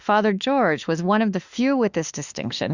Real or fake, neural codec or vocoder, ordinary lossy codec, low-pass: fake; autoencoder, 48 kHz, 32 numbers a frame, DAC-VAE, trained on Japanese speech; Opus, 64 kbps; 7.2 kHz